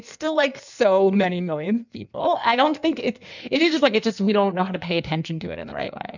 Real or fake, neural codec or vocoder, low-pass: fake; codec, 16 kHz in and 24 kHz out, 1.1 kbps, FireRedTTS-2 codec; 7.2 kHz